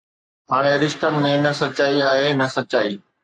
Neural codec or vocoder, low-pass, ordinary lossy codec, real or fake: codec, 44.1 kHz, 3.4 kbps, Pupu-Codec; 9.9 kHz; AAC, 64 kbps; fake